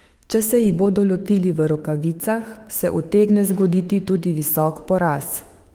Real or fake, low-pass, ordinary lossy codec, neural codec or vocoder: fake; 19.8 kHz; Opus, 24 kbps; autoencoder, 48 kHz, 32 numbers a frame, DAC-VAE, trained on Japanese speech